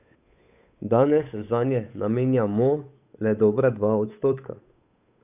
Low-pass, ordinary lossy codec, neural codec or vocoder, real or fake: 3.6 kHz; none; codec, 16 kHz, 8 kbps, FunCodec, trained on Chinese and English, 25 frames a second; fake